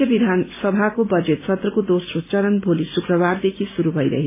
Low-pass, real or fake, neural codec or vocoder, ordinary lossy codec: 3.6 kHz; real; none; MP3, 16 kbps